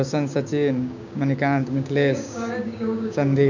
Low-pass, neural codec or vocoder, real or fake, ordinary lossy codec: 7.2 kHz; codec, 16 kHz, 6 kbps, DAC; fake; none